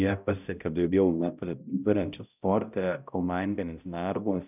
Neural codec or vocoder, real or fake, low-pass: codec, 16 kHz, 0.5 kbps, X-Codec, HuBERT features, trained on balanced general audio; fake; 3.6 kHz